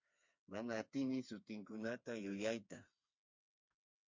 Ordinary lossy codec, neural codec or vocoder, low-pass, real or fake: MP3, 48 kbps; codec, 16 kHz, 4 kbps, FreqCodec, smaller model; 7.2 kHz; fake